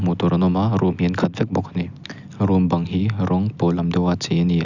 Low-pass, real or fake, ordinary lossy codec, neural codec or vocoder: 7.2 kHz; real; none; none